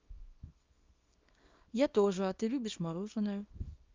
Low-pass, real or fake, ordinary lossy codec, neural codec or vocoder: 7.2 kHz; fake; Opus, 24 kbps; codec, 24 kHz, 0.9 kbps, WavTokenizer, small release